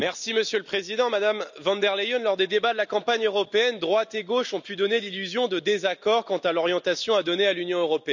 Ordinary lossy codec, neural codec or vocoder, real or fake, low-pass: none; none; real; 7.2 kHz